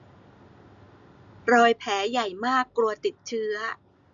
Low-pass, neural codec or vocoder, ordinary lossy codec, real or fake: 7.2 kHz; none; none; real